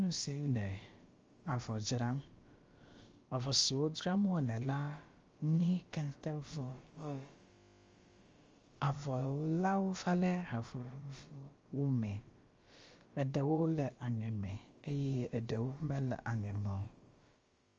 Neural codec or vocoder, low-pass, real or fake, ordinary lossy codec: codec, 16 kHz, about 1 kbps, DyCAST, with the encoder's durations; 7.2 kHz; fake; Opus, 32 kbps